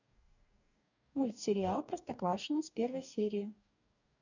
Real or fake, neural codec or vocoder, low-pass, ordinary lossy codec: fake; codec, 44.1 kHz, 2.6 kbps, DAC; 7.2 kHz; none